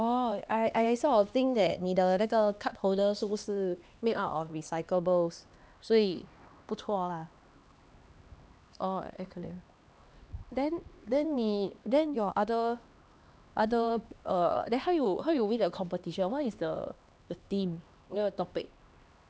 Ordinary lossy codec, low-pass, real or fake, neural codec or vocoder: none; none; fake; codec, 16 kHz, 2 kbps, X-Codec, HuBERT features, trained on LibriSpeech